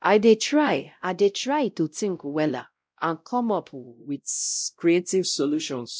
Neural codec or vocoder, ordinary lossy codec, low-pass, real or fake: codec, 16 kHz, 0.5 kbps, X-Codec, WavLM features, trained on Multilingual LibriSpeech; none; none; fake